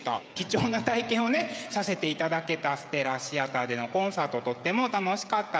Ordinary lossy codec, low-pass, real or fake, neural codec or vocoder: none; none; fake; codec, 16 kHz, 16 kbps, FreqCodec, smaller model